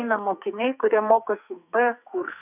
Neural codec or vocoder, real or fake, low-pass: codec, 44.1 kHz, 2.6 kbps, SNAC; fake; 3.6 kHz